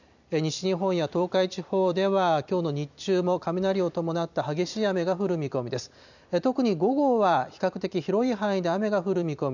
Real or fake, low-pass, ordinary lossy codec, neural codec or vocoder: fake; 7.2 kHz; none; vocoder, 44.1 kHz, 128 mel bands every 256 samples, BigVGAN v2